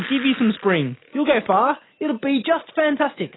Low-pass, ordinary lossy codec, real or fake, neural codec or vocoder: 7.2 kHz; AAC, 16 kbps; real; none